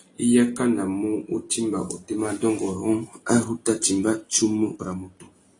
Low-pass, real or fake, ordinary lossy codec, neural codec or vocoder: 10.8 kHz; real; AAC, 32 kbps; none